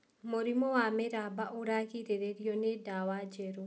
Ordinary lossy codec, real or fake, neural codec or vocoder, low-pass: none; real; none; none